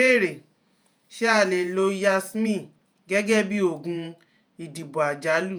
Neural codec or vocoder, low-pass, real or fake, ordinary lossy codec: vocoder, 48 kHz, 128 mel bands, Vocos; none; fake; none